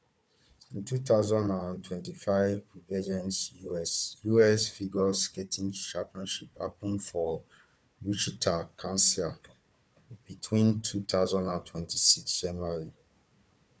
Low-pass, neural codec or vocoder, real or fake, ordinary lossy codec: none; codec, 16 kHz, 4 kbps, FunCodec, trained on Chinese and English, 50 frames a second; fake; none